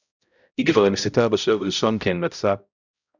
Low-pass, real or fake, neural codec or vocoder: 7.2 kHz; fake; codec, 16 kHz, 0.5 kbps, X-Codec, HuBERT features, trained on balanced general audio